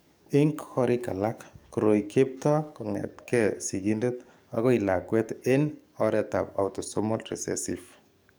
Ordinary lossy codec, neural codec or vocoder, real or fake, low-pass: none; codec, 44.1 kHz, 7.8 kbps, DAC; fake; none